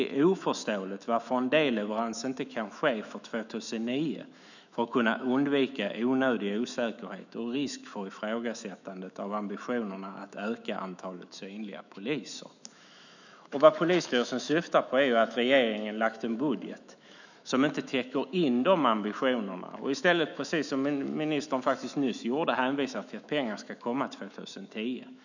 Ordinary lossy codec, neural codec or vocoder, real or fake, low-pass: none; autoencoder, 48 kHz, 128 numbers a frame, DAC-VAE, trained on Japanese speech; fake; 7.2 kHz